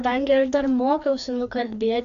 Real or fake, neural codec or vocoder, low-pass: fake; codec, 16 kHz, 2 kbps, FreqCodec, larger model; 7.2 kHz